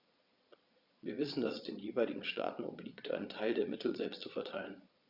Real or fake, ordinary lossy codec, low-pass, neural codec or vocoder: fake; Opus, 64 kbps; 5.4 kHz; vocoder, 22.05 kHz, 80 mel bands, Vocos